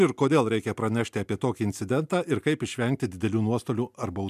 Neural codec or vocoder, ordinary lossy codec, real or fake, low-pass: none; MP3, 96 kbps; real; 14.4 kHz